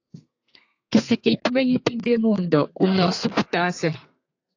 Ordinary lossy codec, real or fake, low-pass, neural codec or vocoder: AAC, 48 kbps; fake; 7.2 kHz; codec, 32 kHz, 1.9 kbps, SNAC